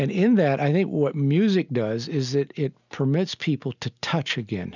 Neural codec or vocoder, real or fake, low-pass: none; real; 7.2 kHz